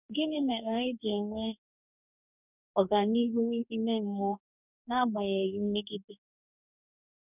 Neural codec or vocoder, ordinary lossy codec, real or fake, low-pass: codec, 44.1 kHz, 2.6 kbps, DAC; none; fake; 3.6 kHz